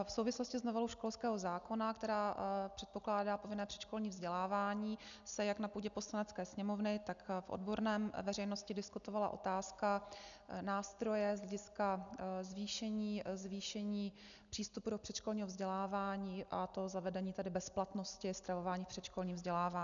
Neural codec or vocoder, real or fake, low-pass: none; real; 7.2 kHz